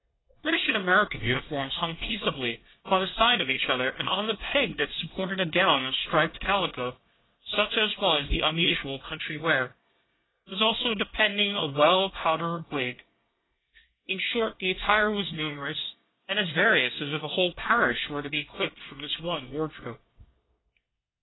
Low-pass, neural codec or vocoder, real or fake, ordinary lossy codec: 7.2 kHz; codec, 24 kHz, 1 kbps, SNAC; fake; AAC, 16 kbps